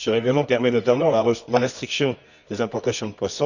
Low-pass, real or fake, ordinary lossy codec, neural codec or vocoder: 7.2 kHz; fake; none; codec, 24 kHz, 0.9 kbps, WavTokenizer, medium music audio release